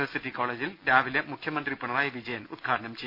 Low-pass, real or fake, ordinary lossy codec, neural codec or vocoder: 5.4 kHz; real; none; none